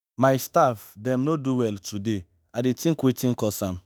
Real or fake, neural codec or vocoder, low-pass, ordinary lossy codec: fake; autoencoder, 48 kHz, 32 numbers a frame, DAC-VAE, trained on Japanese speech; none; none